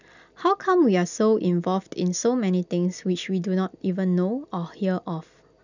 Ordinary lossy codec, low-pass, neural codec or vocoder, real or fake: none; 7.2 kHz; none; real